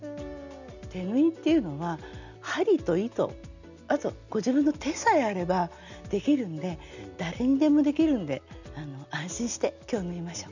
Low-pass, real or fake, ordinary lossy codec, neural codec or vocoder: 7.2 kHz; real; none; none